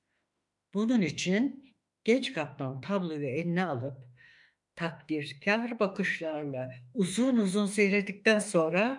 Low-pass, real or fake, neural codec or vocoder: 10.8 kHz; fake; autoencoder, 48 kHz, 32 numbers a frame, DAC-VAE, trained on Japanese speech